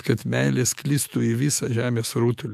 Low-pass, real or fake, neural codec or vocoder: 14.4 kHz; fake; autoencoder, 48 kHz, 128 numbers a frame, DAC-VAE, trained on Japanese speech